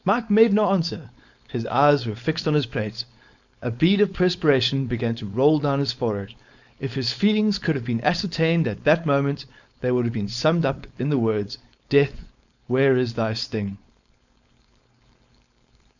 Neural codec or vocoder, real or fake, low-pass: codec, 16 kHz, 4.8 kbps, FACodec; fake; 7.2 kHz